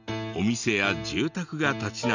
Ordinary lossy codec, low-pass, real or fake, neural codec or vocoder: none; 7.2 kHz; real; none